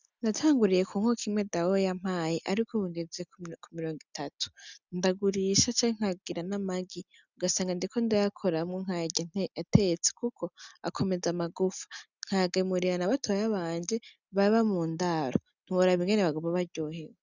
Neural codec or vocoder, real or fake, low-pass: none; real; 7.2 kHz